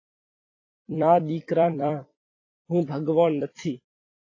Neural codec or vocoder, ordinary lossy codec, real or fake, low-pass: vocoder, 44.1 kHz, 128 mel bands every 256 samples, BigVGAN v2; AAC, 32 kbps; fake; 7.2 kHz